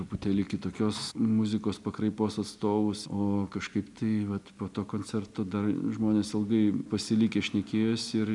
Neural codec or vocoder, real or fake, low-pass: none; real; 10.8 kHz